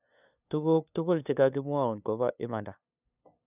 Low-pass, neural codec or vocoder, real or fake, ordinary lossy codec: 3.6 kHz; codec, 16 kHz, 2 kbps, FunCodec, trained on LibriTTS, 25 frames a second; fake; none